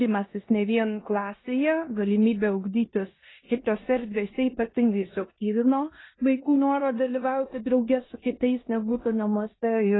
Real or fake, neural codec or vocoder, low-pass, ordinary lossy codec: fake; codec, 16 kHz in and 24 kHz out, 0.9 kbps, LongCat-Audio-Codec, four codebook decoder; 7.2 kHz; AAC, 16 kbps